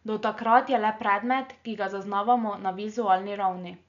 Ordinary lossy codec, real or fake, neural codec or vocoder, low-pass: none; real; none; 7.2 kHz